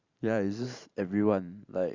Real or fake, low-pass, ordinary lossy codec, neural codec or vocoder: fake; 7.2 kHz; Opus, 64 kbps; vocoder, 44.1 kHz, 128 mel bands every 512 samples, BigVGAN v2